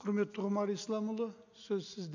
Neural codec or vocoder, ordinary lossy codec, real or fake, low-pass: none; none; real; 7.2 kHz